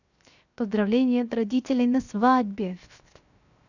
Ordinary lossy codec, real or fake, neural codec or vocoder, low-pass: none; fake; codec, 16 kHz, 0.7 kbps, FocalCodec; 7.2 kHz